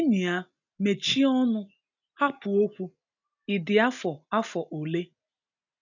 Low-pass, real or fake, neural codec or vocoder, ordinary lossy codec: 7.2 kHz; real; none; none